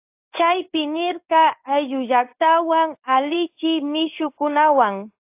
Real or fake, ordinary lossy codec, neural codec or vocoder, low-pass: fake; AAC, 32 kbps; codec, 16 kHz in and 24 kHz out, 1 kbps, XY-Tokenizer; 3.6 kHz